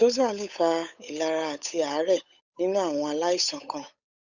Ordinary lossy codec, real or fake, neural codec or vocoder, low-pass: none; fake; codec, 16 kHz, 8 kbps, FunCodec, trained on Chinese and English, 25 frames a second; 7.2 kHz